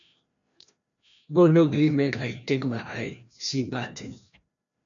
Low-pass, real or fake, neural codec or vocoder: 7.2 kHz; fake; codec, 16 kHz, 1 kbps, FreqCodec, larger model